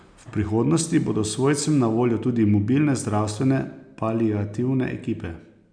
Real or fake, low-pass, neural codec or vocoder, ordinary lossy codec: real; 9.9 kHz; none; none